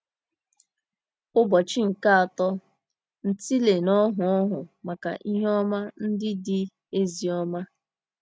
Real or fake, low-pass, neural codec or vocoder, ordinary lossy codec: real; none; none; none